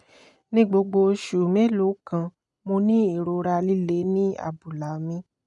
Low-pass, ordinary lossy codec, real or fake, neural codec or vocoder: 10.8 kHz; none; real; none